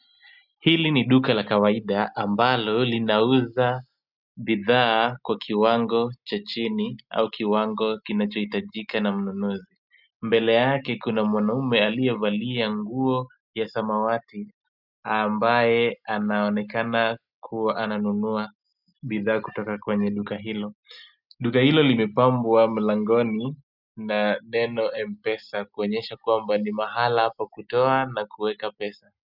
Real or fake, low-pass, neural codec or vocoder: real; 5.4 kHz; none